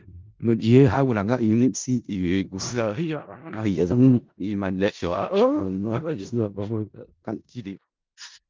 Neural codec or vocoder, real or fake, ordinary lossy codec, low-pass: codec, 16 kHz in and 24 kHz out, 0.4 kbps, LongCat-Audio-Codec, four codebook decoder; fake; Opus, 24 kbps; 7.2 kHz